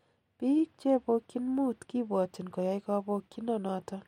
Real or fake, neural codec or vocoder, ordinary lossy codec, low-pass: real; none; MP3, 64 kbps; 19.8 kHz